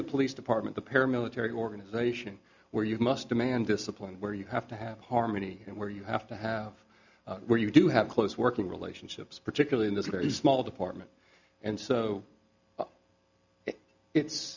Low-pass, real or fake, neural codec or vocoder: 7.2 kHz; real; none